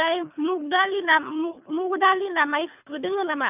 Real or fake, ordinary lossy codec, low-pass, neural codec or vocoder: fake; none; 3.6 kHz; codec, 24 kHz, 3 kbps, HILCodec